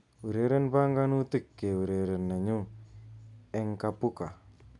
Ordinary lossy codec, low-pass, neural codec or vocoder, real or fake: none; 10.8 kHz; none; real